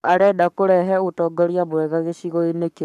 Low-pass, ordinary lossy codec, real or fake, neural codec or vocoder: 14.4 kHz; MP3, 96 kbps; fake; codec, 44.1 kHz, 7.8 kbps, Pupu-Codec